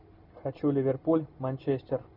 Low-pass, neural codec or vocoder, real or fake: 5.4 kHz; none; real